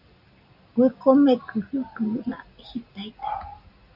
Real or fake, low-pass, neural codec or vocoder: real; 5.4 kHz; none